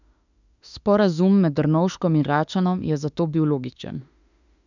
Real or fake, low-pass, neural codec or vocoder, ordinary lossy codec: fake; 7.2 kHz; autoencoder, 48 kHz, 32 numbers a frame, DAC-VAE, trained on Japanese speech; none